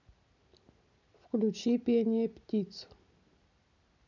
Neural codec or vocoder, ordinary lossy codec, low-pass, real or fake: none; none; 7.2 kHz; real